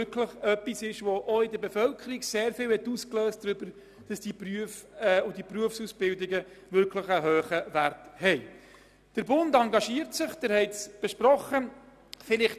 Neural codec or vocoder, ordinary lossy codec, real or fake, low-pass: none; none; real; 14.4 kHz